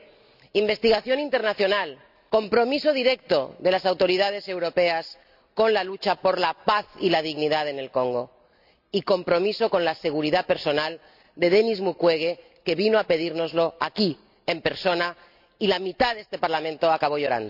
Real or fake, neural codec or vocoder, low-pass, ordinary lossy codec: real; none; 5.4 kHz; none